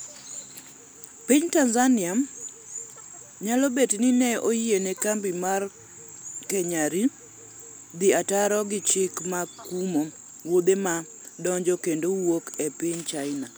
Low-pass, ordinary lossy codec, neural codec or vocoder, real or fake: none; none; none; real